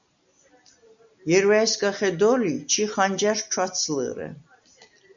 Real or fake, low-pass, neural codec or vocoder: real; 7.2 kHz; none